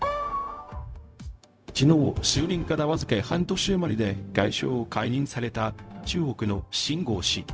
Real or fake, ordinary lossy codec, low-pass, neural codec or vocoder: fake; none; none; codec, 16 kHz, 0.4 kbps, LongCat-Audio-Codec